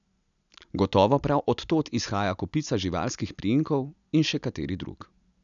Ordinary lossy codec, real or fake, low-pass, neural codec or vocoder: none; real; 7.2 kHz; none